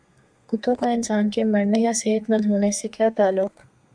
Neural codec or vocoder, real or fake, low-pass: codec, 32 kHz, 1.9 kbps, SNAC; fake; 9.9 kHz